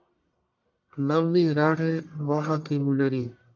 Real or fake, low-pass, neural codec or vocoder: fake; 7.2 kHz; codec, 44.1 kHz, 1.7 kbps, Pupu-Codec